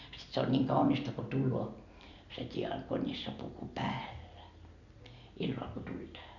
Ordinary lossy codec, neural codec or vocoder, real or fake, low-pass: none; none; real; 7.2 kHz